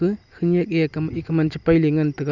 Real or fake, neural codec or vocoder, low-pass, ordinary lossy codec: real; none; 7.2 kHz; none